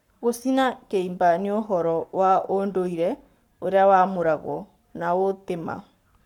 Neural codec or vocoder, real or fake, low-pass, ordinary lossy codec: codec, 44.1 kHz, 7.8 kbps, Pupu-Codec; fake; 19.8 kHz; none